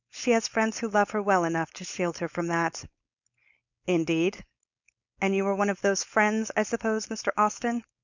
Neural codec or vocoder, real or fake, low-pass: codec, 16 kHz, 4.8 kbps, FACodec; fake; 7.2 kHz